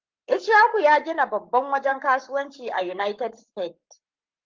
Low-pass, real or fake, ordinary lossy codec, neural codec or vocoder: 7.2 kHz; fake; Opus, 32 kbps; codec, 44.1 kHz, 7.8 kbps, Pupu-Codec